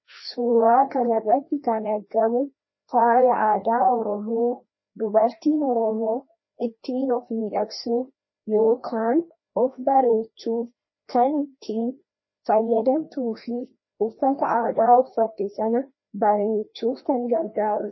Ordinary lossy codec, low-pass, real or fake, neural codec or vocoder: MP3, 24 kbps; 7.2 kHz; fake; codec, 16 kHz, 1 kbps, FreqCodec, larger model